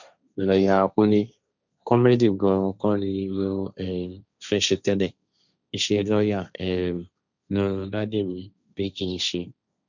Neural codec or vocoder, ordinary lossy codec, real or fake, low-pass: codec, 16 kHz, 1.1 kbps, Voila-Tokenizer; none; fake; 7.2 kHz